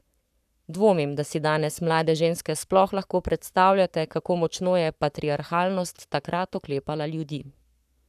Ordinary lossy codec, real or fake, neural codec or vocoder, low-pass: none; fake; codec, 44.1 kHz, 7.8 kbps, Pupu-Codec; 14.4 kHz